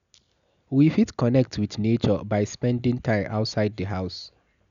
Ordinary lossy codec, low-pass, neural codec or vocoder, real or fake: none; 7.2 kHz; none; real